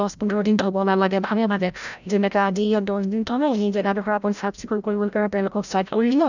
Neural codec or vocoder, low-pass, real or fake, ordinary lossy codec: codec, 16 kHz, 0.5 kbps, FreqCodec, larger model; 7.2 kHz; fake; none